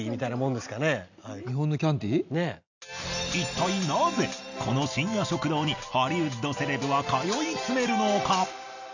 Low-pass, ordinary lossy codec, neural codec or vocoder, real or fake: 7.2 kHz; none; none; real